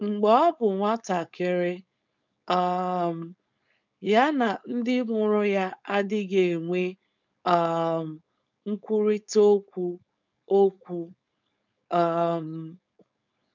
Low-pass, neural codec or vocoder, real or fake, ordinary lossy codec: 7.2 kHz; codec, 16 kHz, 4.8 kbps, FACodec; fake; none